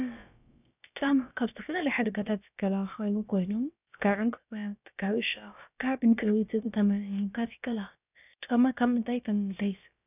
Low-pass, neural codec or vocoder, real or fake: 3.6 kHz; codec, 16 kHz, about 1 kbps, DyCAST, with the encoder's durations; fake